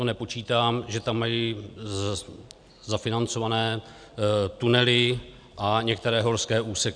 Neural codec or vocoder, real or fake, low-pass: none; real; 9.9 kHz